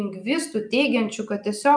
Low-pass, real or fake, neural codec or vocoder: 9.9 kHz; real; none